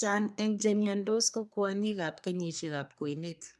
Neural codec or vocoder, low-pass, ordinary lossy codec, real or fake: codec, 24 kHz, 1 kbps, SNAC; none; none; fake